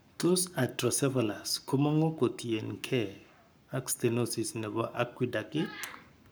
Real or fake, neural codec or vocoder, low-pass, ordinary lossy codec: fake; codec, 44.1 kHz, 7.8 kbps, Pupu-Codec; none; none